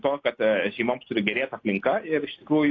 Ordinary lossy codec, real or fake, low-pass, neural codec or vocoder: AAC, 32 kbps; real; 7.2 kHz; none